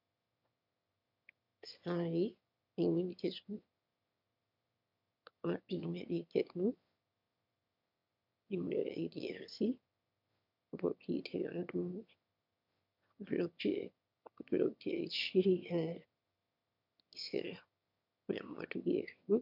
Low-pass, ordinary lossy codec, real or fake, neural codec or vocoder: 5.4 kHz; MP3, 48 kbps; fake; autoencoder, 22.05 kHz, a latent of 192 numbers a frame, VITS, trained on one speaker